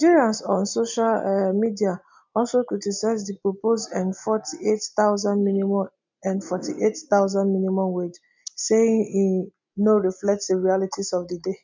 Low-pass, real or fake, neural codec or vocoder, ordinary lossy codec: 7.2 kHz; real; none; MP3, 64 kbps